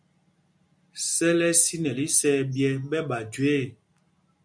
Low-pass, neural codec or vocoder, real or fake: 9.9 kHz; none; real